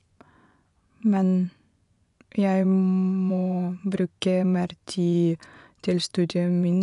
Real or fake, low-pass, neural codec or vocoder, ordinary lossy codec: fake; 10.8 kHz; vocoder, 24 kHz, 100 mel bands, Vocos; none